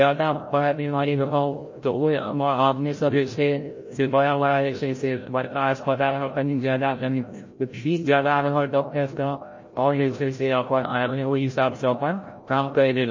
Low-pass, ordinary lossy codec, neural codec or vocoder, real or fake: 7.2 kHz; MP3, 32 kbps; codec, 16 kHz, 0.5 kbps, FreqCodec, larger model; fake